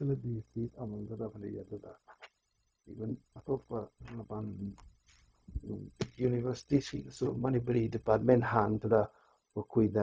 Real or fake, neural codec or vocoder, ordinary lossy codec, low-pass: fake; codec, 16 kHz, 0.4 kbps, LongCat-Audio-Codec; none; none